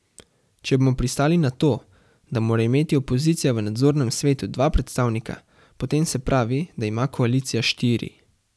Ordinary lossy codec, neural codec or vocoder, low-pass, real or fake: none; none; none; real